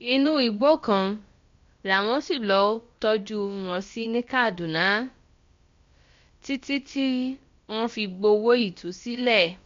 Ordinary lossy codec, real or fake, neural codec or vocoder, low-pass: MP3, 48 kbps; fake; codec, 16 kHz, about 1 kbps, DyCAST, with the encoder's durations; 7.2 kHz